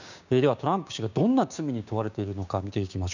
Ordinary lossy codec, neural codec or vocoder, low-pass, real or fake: none; codec, 16 kHz, 6 kbps, DAC; 7.2 kHz; fake